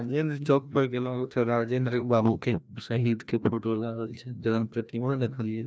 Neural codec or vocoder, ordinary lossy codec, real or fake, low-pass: codec, 16 kHz, 1 kbps, FreqCodec, larger model; none; fake; none